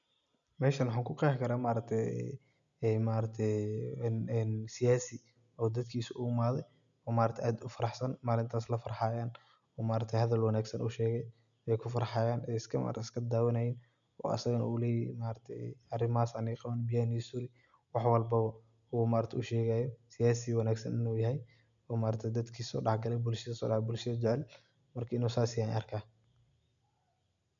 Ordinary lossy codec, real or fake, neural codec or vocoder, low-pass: none; real; none; 7.2 kHz